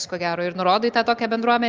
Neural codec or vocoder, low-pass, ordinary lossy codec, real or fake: none; 7.2 kHz; Opus, 32 kbps; real